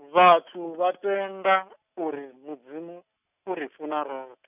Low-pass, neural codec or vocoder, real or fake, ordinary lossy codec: 3.6 kHz; none; real; none